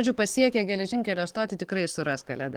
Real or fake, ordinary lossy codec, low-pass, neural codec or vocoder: fake; Opus, 24 kbps; 19.8 kHz; codec, 44.1 kHz, 7.8 kbps, DAC